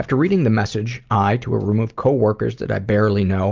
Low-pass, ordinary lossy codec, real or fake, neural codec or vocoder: 7.2 kHz; Opus, 24 kbps; real; none